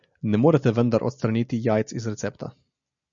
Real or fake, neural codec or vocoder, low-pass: real; none; 7.2 kHz